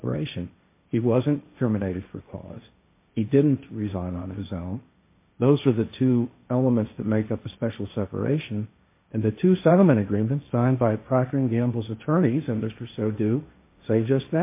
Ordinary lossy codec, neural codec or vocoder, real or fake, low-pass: MP3, 24 kbps; codec, 16 kHz, 1.1 kbps, Voila-Tokenizer; fake; 3.6 kHz